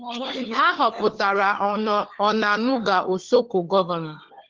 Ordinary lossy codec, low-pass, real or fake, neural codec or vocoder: Opus, 16 kbps; 7.2 kHz; fake; codec, 16 kHz, 4 kbps, FunCodec, trained on LibriTTS, 50 frames a second